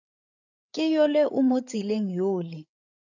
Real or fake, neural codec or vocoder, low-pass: fake; codec, 16 kHz, 8 kbps, FreqCodec, larger model; 7.2 kHz